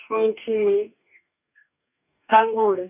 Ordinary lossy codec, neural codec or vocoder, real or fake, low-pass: none; codec, 44.1 kHz, 2.6 kbps, DAC; fake; 3.6 kHz